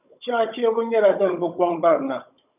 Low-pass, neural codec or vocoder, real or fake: 3.6 kHz; codec, 16 kHz, 16 kbps, FunCodec, trained on Chinese and English, 50 frames a second; fake